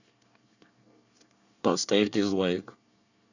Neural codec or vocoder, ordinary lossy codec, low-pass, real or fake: codec, 24 kHz, 1 kbps, SNAC; none; 7.2 kHz; fake